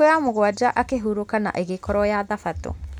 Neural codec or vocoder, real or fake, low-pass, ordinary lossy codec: none; real; 19.8 kHz; none